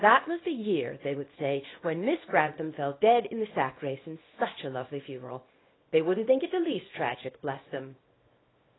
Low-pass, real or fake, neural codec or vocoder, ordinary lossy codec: 7.2 kHz; fake; codec, 24 kHz, 0.9 kbps, WavTokenizer, small release; AAC, 16 kbps